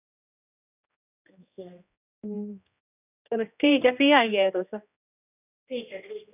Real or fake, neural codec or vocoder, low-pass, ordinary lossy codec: fake; codec, 16 kHz, 1 kbps, X-Codec, HuBERT features, trained on general audio; 3.6 kHz; none